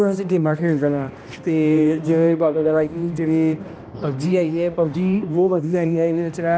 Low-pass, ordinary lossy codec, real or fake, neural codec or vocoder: none; none; fake; codec, 16 kHz, 1 kbps, X-Codec, HuBERT features, trained on balanced general audio